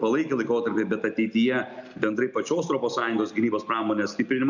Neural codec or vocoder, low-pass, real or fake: none; 7.2 kHz; real